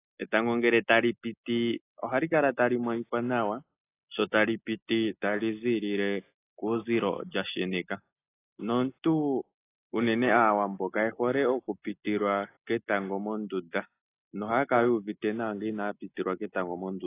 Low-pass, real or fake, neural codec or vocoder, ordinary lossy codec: 3.6 kHz; real; none; AAC, 24 kbps